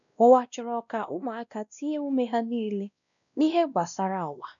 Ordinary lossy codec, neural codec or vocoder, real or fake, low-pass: none; codec, 16 kHz, 1 kbps, X-Codec, WavLM features, trained on Multilingual LibriSpeech; fake; 7.2 kHz